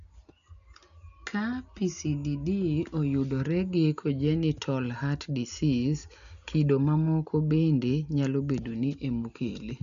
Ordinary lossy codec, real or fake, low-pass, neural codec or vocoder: none; real; 7.2 kHz; none